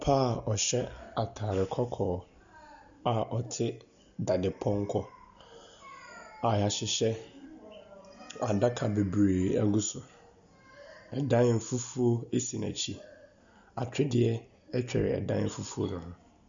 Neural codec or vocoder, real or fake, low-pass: none; real; 7.2 kHz